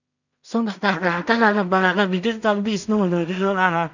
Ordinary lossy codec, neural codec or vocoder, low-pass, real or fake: none; codec, 16 kHz in and 24 kHz out, 0.4 kbps, LongCat-Audio-Codec, two codebook decoder; 7.2 kHz; fake